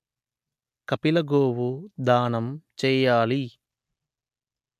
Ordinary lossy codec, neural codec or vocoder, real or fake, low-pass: MP3, 96 kbps; none; real; 14.4 kHz